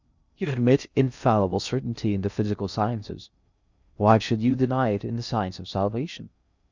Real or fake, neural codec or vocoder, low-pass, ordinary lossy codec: fake; codec, 16 kHz in and 24 kHz out, 0.6 kbps, FocalCodec, streaming, 2048 codes; 7.2 kHz; Opus, 64 kbps